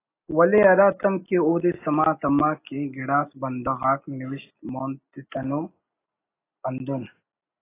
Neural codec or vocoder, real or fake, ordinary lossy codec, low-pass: none; real; AAC, 24 kbps; 3.6 kHz